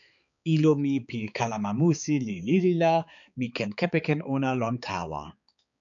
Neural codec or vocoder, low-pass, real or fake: codec, 16 kHz, 4 kbps, X-Codec, HuBERT features, trained on balanced general audio; 7.2 kHz; fake